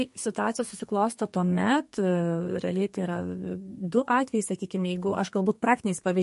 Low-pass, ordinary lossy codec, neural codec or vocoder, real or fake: 14.4 kHz; MP3, 48 kbps; codec, 32 kHz, 1.9 kbps, SNAC; fake